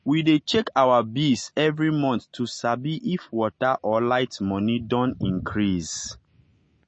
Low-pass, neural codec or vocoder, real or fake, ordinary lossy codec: 9.9 kHz; none; real; MP3, 32 kbps